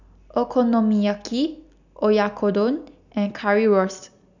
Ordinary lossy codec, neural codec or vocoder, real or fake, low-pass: none; none; real; 7.2 kHz